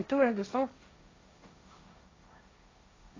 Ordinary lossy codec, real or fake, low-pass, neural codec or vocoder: AAC, 32 kbps; fake; 7.2 kHz; codec, 16 kHz, 1.1 kbps, Voila-Tokenizer